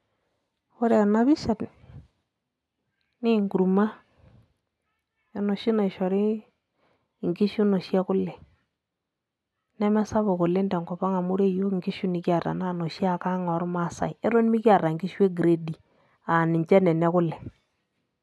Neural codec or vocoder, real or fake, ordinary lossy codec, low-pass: none; real; none; none